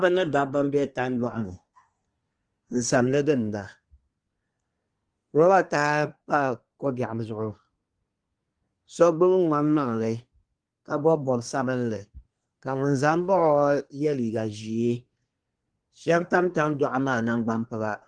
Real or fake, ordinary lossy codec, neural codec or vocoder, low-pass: fake; Opus, 24 kbps; codec, 24 kHz, 1 kbps, SNAC; 9.9 kHz